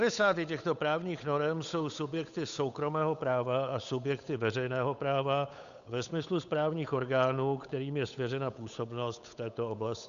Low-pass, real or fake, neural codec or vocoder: 7.2 kHz; fake; codec, 16 kHz, 8 kbps, FunCodec, trained on Chinese and English, 25 frames a second